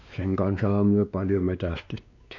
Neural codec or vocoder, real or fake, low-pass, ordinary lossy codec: codec, 16 kHz, 2 kbps, X-Codec, WavLM features, trained on Multilingual LibriSpeech; fake; 7.2 kHz; MP3, 48 kbps